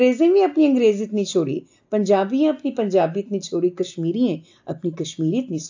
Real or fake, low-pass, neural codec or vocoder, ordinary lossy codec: real; 7.2 kHz; none; AAC, 48 kbps